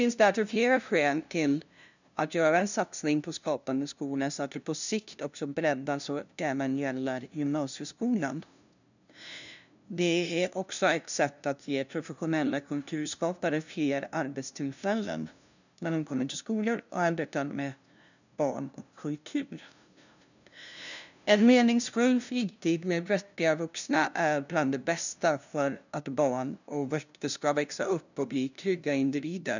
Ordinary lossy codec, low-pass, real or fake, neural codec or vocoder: none; 7.2 kHz; fake; codec, 16 kHz, 0.5 kbps, FunCodec, trained on LibriTTS, 25 frames a second